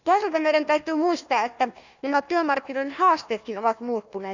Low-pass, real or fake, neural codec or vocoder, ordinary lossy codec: 7.2 kHz; fake; codec, 16 kHz, 1 kbps, FunCodec, trained on Chinese and English, 50 frames a second; none